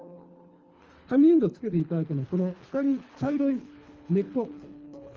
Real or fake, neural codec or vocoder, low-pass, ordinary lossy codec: fake; codec, 24 kHz, 3 kbps, HILCodec; 7.2 kHz; Opus, 24 kbps